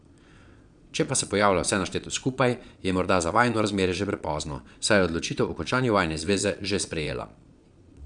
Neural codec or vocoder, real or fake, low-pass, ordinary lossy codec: vocoder, 22.05 kHz, 80 mel bands, Vocos; fake; 9.9 kHz; none